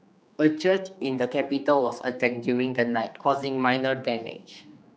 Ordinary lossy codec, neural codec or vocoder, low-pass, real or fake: none; codec, 16 kHz, 2 kbps, X-Codec, HuBERT features, trained on general audio; none; fake